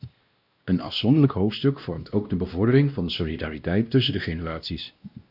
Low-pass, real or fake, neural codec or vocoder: 5.4 kHz; fake; codec, 16 kHz, 1 kbps, X-Codec, WavLM features, trained on Multilingual LibriSpeech